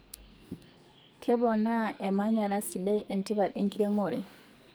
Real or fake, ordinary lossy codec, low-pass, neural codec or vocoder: fake; none; none; codec, 44.1 kHz, 2.6 kbps, SNAC